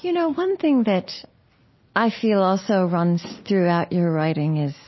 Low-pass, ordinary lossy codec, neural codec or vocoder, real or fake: 7.2 kHz; MP3, 24 kbps; none; real